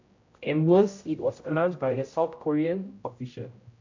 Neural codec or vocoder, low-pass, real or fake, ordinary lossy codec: codec, 16 kHz, 0.5 kbps, X-Codec, HuBERT features, trained on general audio; 7.2 kHz; fake; AAC, 48 kbps